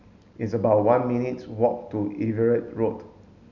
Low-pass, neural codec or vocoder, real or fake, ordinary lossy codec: 7.2 kHz; none; real; none